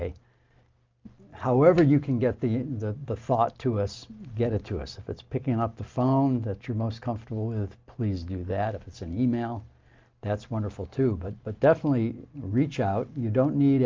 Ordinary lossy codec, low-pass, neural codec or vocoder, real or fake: Opus, 24 kbps; 7.2 kHz; none; real